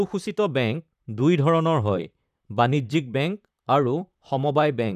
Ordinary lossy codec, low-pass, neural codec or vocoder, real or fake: none; 14.4 kHz; vocoder, 44.1 kHz, 128 mel bands, Pupu-Vocoder; fake